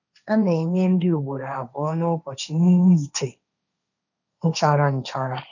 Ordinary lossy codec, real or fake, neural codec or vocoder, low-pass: none; fake; codec, 16 kHz, 1.1 kbps, Voila-Tokenizer; 7.2 kHz